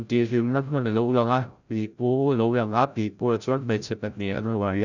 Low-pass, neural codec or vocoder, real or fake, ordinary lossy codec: 7.2 kHz; codec, 16 kHz, 0.5 kbps, FreqCodec, larger model; fake; none